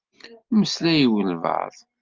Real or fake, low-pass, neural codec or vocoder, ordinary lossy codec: real; 7.2 kHz; none; Opus, 32 kbps